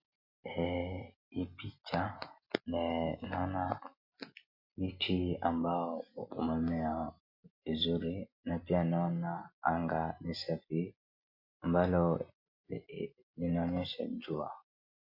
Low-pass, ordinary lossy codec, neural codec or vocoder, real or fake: 5.4 kHz; MP3, 32 kbps; none; real